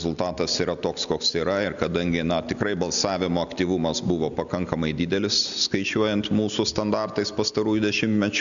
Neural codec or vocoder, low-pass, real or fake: none; 7.2 kHz; real